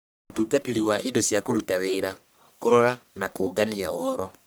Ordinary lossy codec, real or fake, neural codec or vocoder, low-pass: none; fake; codec, 44.1 kHz, 1.7 kbps, Pupu-Codec; none